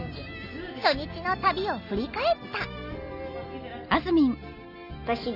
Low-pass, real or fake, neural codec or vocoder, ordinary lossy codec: 5.4 kHz; real; none; none